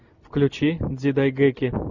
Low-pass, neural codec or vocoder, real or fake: 7.2 kHz; none; real